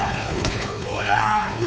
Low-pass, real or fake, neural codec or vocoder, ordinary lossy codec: none; fake; codec, 16 kHz, 4 kbps, X-Codec, WavLM features, trained on Multilingual LibriSpeech; none